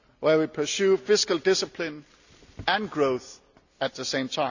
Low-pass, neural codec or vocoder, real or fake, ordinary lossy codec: 7.2 kHz; none; real; none